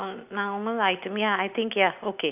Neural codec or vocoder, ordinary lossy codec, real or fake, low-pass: none; none; real; 3.6 kHz